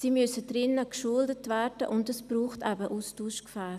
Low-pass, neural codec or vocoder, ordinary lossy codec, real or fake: 14.4 kHz; none; none; real